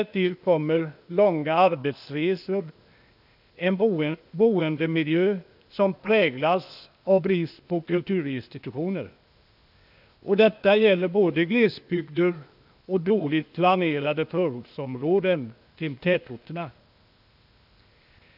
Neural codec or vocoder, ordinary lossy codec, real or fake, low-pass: codec, 16 kHz, 0.8 kbps, ZipCodec; none; fake; 5.4 kHz